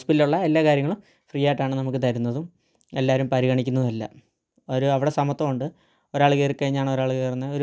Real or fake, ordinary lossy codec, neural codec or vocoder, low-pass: real; none; none; none